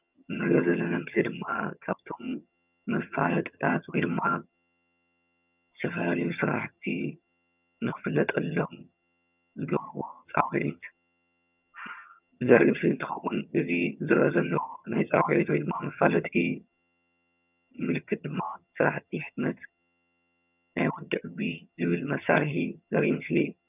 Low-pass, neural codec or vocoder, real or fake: 3.6 kHz; vocoder, 22.05 kHz, 80 mel bands, HiFi-GAN; fake